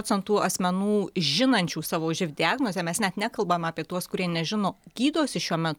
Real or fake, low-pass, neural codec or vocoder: real; 19.8 kHz; none